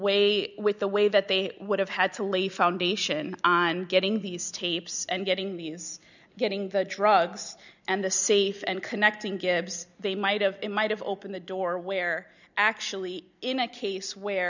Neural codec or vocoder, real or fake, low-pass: none; real; 7.2 kHz